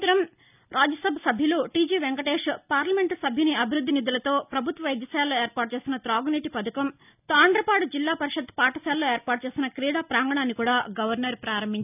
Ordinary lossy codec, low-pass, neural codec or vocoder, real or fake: none; 3.6 kHz; none; real